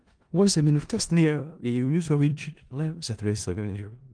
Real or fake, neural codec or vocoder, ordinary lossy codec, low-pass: fake; codec, 16 kHz in and 24 kHz out, 0.4 kbps, LongCat-Audio-Codec, four codebook decoder; Opus, 24 kbps; 9.9 kHz